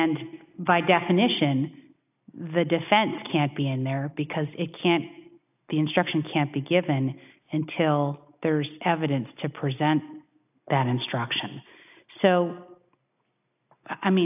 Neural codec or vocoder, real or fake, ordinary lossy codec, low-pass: none; real; AAC, 32 kbps; 3.6 kHz